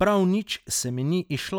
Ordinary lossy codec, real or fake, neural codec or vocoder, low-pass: none; real; none; none